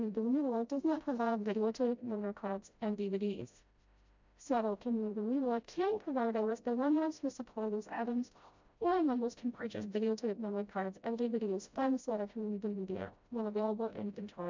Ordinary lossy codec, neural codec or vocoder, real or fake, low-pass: MP3, 64 kbps; codec, 16 kHz, 0.5 kbps, FreqCodec, smaller model; fake; 7.2 kHz